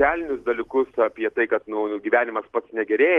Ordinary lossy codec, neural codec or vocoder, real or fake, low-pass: Opus, 16 kbps; none; real; 10.8 kHz